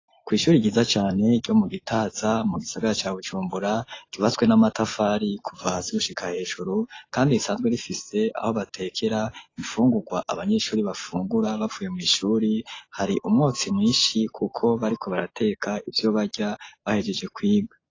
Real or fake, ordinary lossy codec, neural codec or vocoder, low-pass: real; AAC, 32 kbps; none; 7.2 kHz